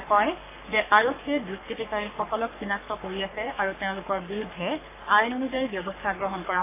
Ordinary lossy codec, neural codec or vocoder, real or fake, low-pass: AAC, 24 kbps; codec, 44.1 kHz, 3.4 kbps, Pupu-Codec; fake; 3.6 kHz